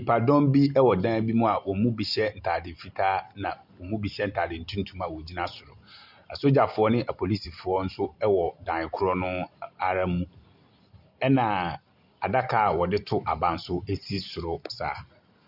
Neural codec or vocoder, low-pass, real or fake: none; 5.4 kHz; real